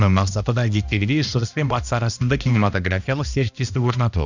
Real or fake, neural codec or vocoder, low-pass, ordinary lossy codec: fake; codec, 16 kHz, 1 kbps, X-Codec, HuBERT features, trained on balanced general audio; 7.2 kHz; none